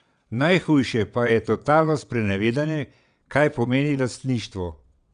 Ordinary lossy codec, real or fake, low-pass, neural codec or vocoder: none; fake; 9.9 kHz; vocoder, 22.05 kHz, 80 mel bands, WaveNeXt